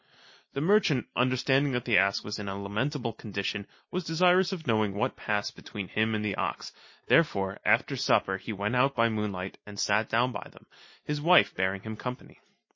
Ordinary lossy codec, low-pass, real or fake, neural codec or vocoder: MP3, 32 kbps; 7.2 kHz; real; none